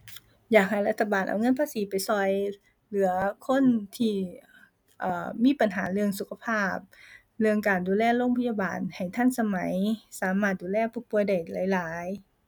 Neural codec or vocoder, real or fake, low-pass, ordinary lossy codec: none; real; 19.8 kHz; none